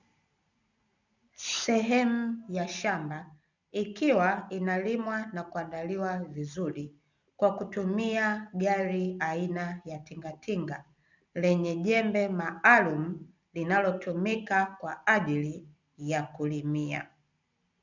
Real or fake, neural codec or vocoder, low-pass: real; none; 7.2 kHz